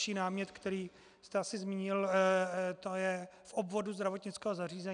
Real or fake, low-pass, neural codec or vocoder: real; 9.9 kHz; none